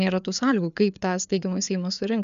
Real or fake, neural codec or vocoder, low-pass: fake; codec, 16 kHz, 4 kbps, FreqCodec, larger model; 7.2 kHz